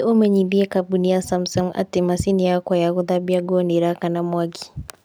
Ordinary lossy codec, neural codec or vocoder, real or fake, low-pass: none; none; real; none